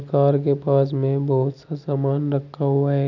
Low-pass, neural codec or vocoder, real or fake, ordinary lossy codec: 7.2 kHz; none; real; none